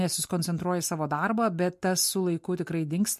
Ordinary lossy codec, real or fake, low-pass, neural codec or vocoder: MP3, 64 kbps; real; 14.4 kHz; none